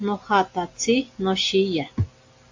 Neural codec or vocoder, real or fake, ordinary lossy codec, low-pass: none; real; AAC, 48 kbps; 7.2 kHz